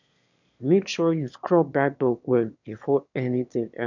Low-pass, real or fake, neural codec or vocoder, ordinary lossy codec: 7.2 kHz; fake; autoencoder, 22.05 kHz, a latent of 192 numbers a frame, VITS, trained on one speaker; none